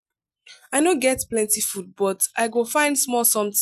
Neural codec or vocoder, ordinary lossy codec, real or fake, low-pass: none; none; real; 14.4 kHz